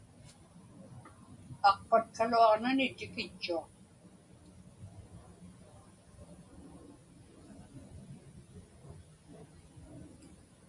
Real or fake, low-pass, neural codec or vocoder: real; 10.8 kHz; none